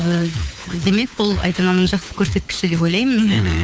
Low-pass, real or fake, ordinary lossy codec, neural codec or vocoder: none; fake; none; codec, 16 kHz, 4 kbps, FunCodec, trained on LibriTTS, 50 frames a second